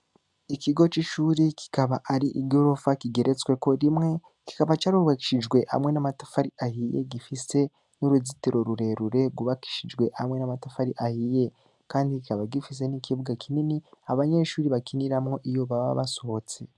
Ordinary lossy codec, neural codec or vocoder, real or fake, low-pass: MP3, 96 kbps; none; real; 10.8 kHz